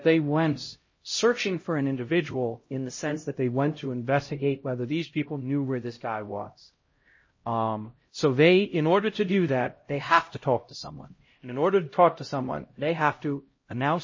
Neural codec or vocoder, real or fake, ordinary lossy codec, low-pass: codec, 16 kHz, 0.5 kbps, X-Codec, HuBERT features, trained on LibriSpeech; fake; MP3, 32 kbps; 7.2 kHz